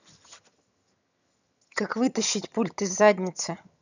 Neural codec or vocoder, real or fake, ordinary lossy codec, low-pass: vocoder, 22.05 kHz, 80 mel bands, HiFi-GAN; fake; none; 7.2 kHz